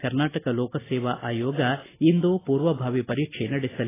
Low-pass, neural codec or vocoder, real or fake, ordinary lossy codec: 3.6 kHz; none; real; AAC, 16 kbps